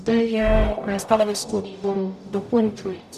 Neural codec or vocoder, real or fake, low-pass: codec, 44.1 kHz, 0.9 kbps, DAC; fake; 14.4 kHz